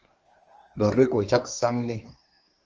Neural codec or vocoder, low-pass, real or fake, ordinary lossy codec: codec, 24 kHz, 1 kbps, SNAC; 7.2 kHz; fake; Opus, 24 kbps